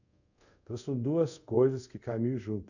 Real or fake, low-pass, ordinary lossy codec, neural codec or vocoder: fake; 7.2 kHz; none; codec, 24 kHz, 0.5 kbps, DualCodec